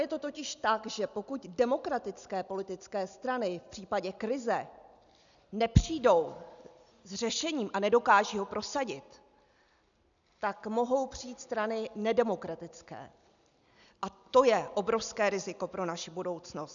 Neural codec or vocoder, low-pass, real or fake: none; 7.2 kHz; real